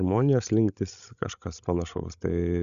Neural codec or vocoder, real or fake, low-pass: codec, 16 kHz, 16 kbps, FreqCodec, larger model; fake; 7.2 kHz